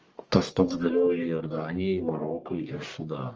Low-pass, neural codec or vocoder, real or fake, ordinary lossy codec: 7.2 kHz; codec, 44.1 kHz, 1.7 kbps, Pupu-Codec; fake; Opus, 24 kbps